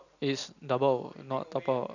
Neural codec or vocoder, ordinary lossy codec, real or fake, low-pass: none; none; real; 7.2 kHz